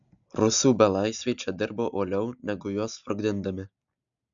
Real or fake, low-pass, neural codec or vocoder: real; 7.2 kHz; none